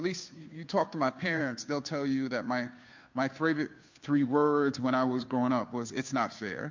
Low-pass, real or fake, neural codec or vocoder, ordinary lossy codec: 7.2 kHz; fake; codec, 16 kHz, 2 kbps, FunCodec, trained on Chinese and English, 25 frames a second; MP3, 48 kbps